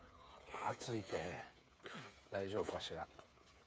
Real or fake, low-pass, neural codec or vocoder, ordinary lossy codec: fake; none; codec, 16 kHz, 8 kbps, FreqCodec, smaller model; none